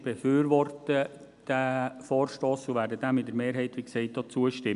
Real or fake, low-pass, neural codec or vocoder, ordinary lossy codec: real; 10.8 kHz; none; none